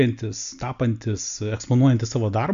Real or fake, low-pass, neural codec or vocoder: real; 7.2 kHz; none